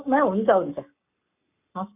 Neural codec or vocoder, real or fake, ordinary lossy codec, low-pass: codec, 44.1 kHz, 7.8 kbps, Pupu-Codec; fake; none; 3.6 kHz